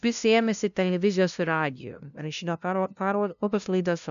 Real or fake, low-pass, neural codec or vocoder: fake; 7.2 kHz; codec, 16 kHz, 0.5 kbps, FunCodec, trained on LibriTTS, 25 frames a second